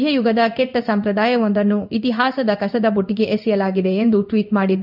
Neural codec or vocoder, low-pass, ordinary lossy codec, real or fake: codec, 16 kHz in and 24 kHz out, 1 kbps, XY-Tokenizer; 5.4 kHz; none; fake